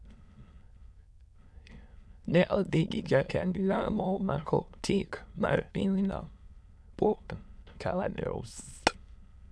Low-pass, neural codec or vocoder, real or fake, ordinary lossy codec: none; autoencoder, 22.05 kHz, a latent of 192 numbers a frame, VITS, trained on many speakers; fake; none